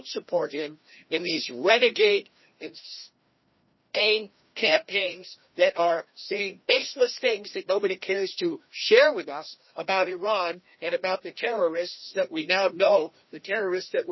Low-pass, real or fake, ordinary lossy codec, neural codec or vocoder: 7.2 kHz; fake; MP3, 24 kbps; codec, 16 kHz, 1 kbps, FreqCodec, larger model